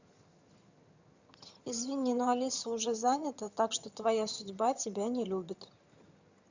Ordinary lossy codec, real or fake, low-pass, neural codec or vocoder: Opus, 64 kbps; fake; 7.2 kHz; vocoder, 22.05 kHz, 80 mel bands, HiFi-GAN